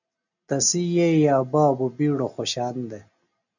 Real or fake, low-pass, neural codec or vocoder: real; 7.2 kHz; none